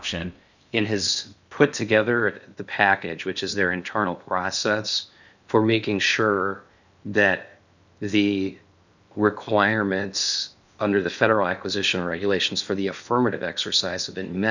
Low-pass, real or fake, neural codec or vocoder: 7.2 kHz; fake; codec, 16 kHz in and 24 kHz out, 0.6 kbps, FocalCodec, streaming, 4096 codes